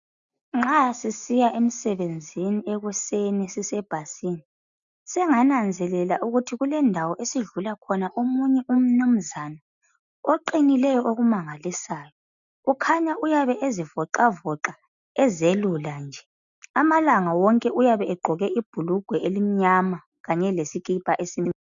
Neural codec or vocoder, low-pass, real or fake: none; 7.2 kHz; real